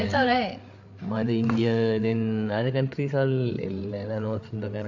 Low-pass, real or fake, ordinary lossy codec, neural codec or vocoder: 7.2 kHz; fake; AAC, 48 kbps; codec, 16 kHz, 8 kbps, FreqCodec, larger model